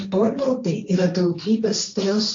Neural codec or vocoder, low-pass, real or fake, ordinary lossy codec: codec, 16 kHz, 1.1 kbps, Voila-Tokenizer; 7.2 kHz; fake; AAC, 48 kbps